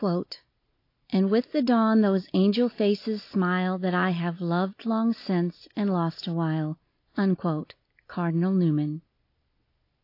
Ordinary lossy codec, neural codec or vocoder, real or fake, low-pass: AAC, 32 kbps; none; real; 5.4 kHz